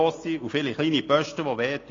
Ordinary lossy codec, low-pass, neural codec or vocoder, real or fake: AAC, 32 kbps; 7.2 kHz; none; real